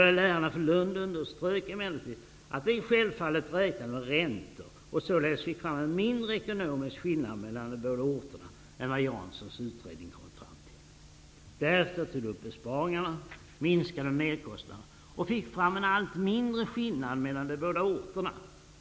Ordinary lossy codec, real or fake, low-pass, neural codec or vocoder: none; real; none; none